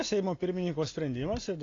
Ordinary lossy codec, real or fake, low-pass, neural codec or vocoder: AAC, 32 kbps; real; 7.2 kHz; none